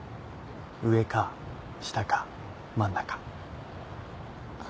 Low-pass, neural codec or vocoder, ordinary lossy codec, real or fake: none; none; none; real